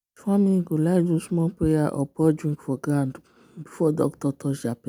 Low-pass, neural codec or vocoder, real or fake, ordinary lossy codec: 19.8 kHz; none; real; none